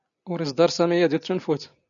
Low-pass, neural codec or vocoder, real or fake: 7.2 kHz; none; real